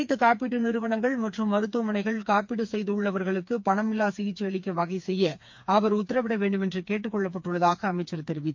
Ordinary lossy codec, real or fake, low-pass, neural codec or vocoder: MP3, 48 kbps; fake; 7.2 kHz; codec, 16 kHz, 4 kbps, FreqCodec, smaller model